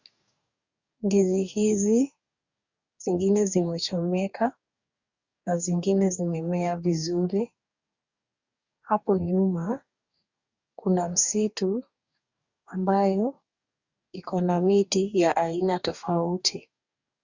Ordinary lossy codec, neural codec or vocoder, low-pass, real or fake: Opus, 64 kbps; codec, 44.1 kHz, 2.6 kbps, DAC; 7.2 kHz; fake